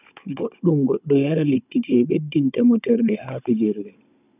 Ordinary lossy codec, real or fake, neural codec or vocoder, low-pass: none; fake; codec, 16 kHz, 16 kbps, FunCodec, trained on Chinese and English, 50 frames a second; 3.6 kHz